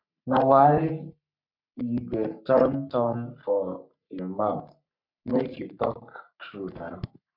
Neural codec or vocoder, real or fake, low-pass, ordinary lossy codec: codec, 44.1 kHz, 3.4 kbps, Pupu-Codec; fake; 5.4 kHz; MP3, 48 kbps